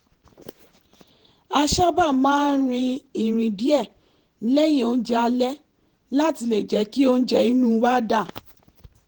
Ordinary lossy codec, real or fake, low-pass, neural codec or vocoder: Opus, 16 kbps; fake; 19.8 kHz; vocoder, 48 kHz, 128 mel bands, Vocos